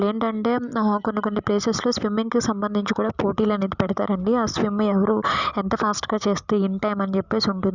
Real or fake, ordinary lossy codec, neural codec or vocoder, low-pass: real; Opus, 64 kbps; none; 7.2 kHz